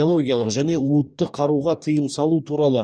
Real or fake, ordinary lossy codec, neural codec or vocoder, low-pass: fake; none; codec, 44.1 kHz, 2.6 kbps, DAC; 9.9 kHz